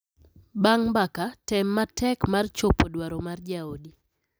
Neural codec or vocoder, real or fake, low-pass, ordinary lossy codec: none; real; none; none